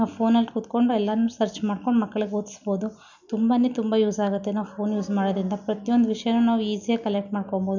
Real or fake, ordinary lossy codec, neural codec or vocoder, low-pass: real; none; none; 7.2 kHz